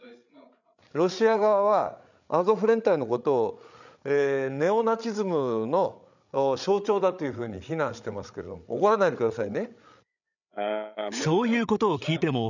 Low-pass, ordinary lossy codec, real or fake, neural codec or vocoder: 7.2 kHz; none; fake; codec, 16 kHz, 8 kbps, FreqCodec, larger model